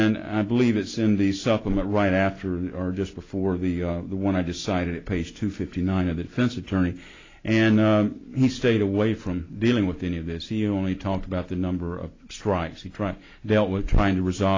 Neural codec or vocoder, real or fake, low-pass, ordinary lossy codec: none; real; 7.2 kHz; AAC, 32 kbps